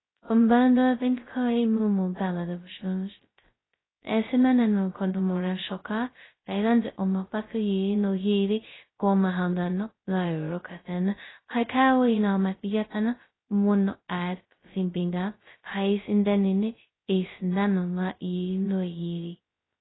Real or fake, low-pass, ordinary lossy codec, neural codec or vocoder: fake; 7.2 kHz; AAC, 16 kbps; codec, 16 kHz, 0.2 kbps, FocalCodec